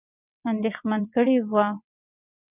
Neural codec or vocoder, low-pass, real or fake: vocoder, 22.05 kHz, 80 mel bands, WaveNeXt; 3.6 kHz; fake